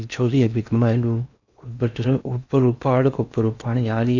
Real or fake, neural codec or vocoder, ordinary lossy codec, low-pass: fake; codec, 16 kHz in and 24 kHz out, 0.8 kbps, FocalCodec, streaming, 65536 codes; none; 7.2 kHz